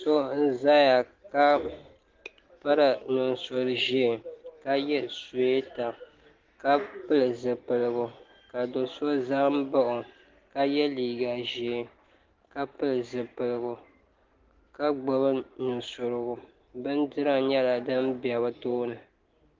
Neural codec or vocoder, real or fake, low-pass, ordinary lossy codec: none; real; 7.2 kHz; Opus, 16 kbps